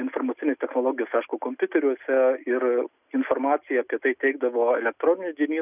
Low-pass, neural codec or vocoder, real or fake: 3.6 kHz; none; real